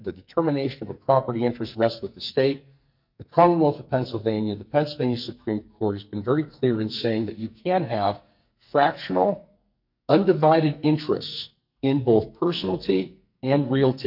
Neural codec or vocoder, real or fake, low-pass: codec, 44.1 kHz, 2.6 kbps, SNAC; fake; 5.4 kHz